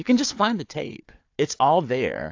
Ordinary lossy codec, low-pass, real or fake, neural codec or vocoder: AAC, 48 kbps; 7.2 kHz; fake; codec, 16 kHz, 4 kbps, FunCodec, trained on Chinese and English, 50 frames a second